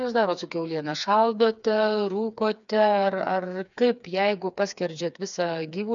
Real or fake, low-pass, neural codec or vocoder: fake; 7.2 kHz; codec, 16 kHz, 4 kbps, FreqCodec, smaller model